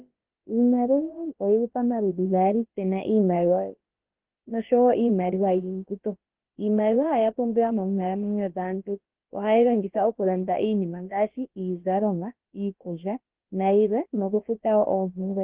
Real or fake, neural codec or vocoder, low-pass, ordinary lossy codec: fake; codec, 16 kHz, about 1 kbps, DyCAST, with the encoder's durations; 3.6 kHz; Opus, 16 kbps